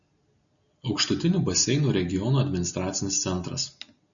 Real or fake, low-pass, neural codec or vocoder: real; 7.2 kHz; none